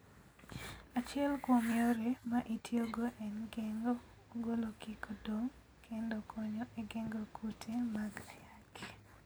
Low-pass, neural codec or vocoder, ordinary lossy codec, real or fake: none; none; none; real